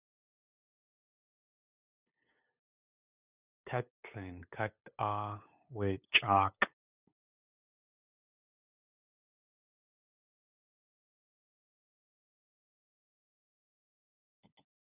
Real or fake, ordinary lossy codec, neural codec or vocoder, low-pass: fake; Opus, 64 kbps; codec, 16 kHz, 8 kbps, FunCodec, trained on LibriTTS, 25 frames a second; 3.6 kHz